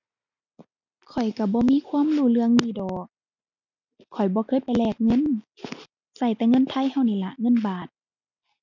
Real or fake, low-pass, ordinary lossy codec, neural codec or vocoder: real; 7.2 kHz; none; none